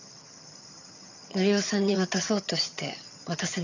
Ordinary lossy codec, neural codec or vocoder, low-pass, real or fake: none; vocoder, 22.05 kHz, 80 mel bands, HiFi-GAN; 7.2 kHz; fake